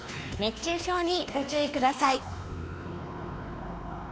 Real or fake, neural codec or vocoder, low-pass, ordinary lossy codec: fake; codec, 16 kHz, 2 kbps, X-Codec, WavLM features, trained on Multilingual LibriSpeech; none; none